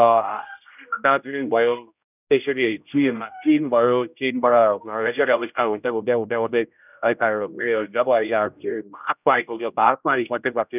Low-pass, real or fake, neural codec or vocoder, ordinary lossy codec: 3.6 kHz; fake; codec, 16 kHz, 0.5 kbps, X-Codec, HuBERT features, trained on general audio; none